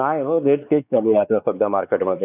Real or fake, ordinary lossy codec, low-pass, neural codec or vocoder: fake; none; 3.6 kHz; codec, 16 kHz, 4 kbps, X-Codec, WavLM features, trained on Multilingual LibriSpeech